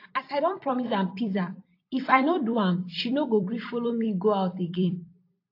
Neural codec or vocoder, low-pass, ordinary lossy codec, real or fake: vocoder, 44.1 kHz, 128 mel bands every 256 samples, BigVGAN v2; 5.4 kHz; AAC, 32 kbps; fake